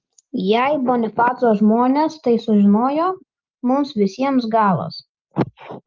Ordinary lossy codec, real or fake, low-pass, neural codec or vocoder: Opus, 24 kbps; real; 7.2 kHz; none